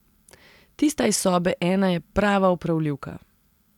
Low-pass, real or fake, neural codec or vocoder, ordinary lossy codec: 19.8 kHz; real; none; none